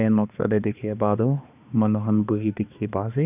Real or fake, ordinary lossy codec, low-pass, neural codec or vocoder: fake; none; 3.6 kHz; codec, 16 kHz, 2 kbps, X-Codec, HuBERT features, trained on balanced general audio